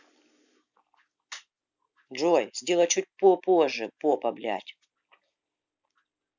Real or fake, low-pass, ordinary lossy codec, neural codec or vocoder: real; 7.2 kHz; none; none